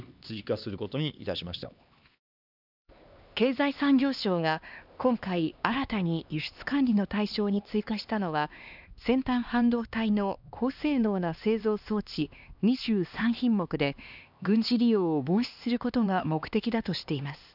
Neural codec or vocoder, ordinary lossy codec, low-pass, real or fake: codec, 16 kHz, 2 kbps, X-Codec, HuBERT features, trained on LibriSpeech; none; 5.4 kHz; fake